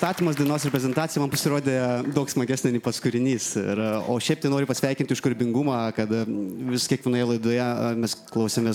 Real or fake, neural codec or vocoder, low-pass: real; none; 19.8 kHz